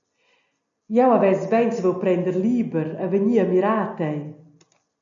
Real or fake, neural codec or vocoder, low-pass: real; none; 7.2 kHz